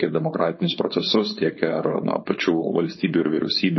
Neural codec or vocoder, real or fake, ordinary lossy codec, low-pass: codec, 16 kHz, 4.8 kbps, FACodec; fake; MP3, 24 kbps; 7.2 kHz